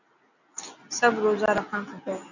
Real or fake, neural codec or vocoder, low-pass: real; none; 7.2 kHz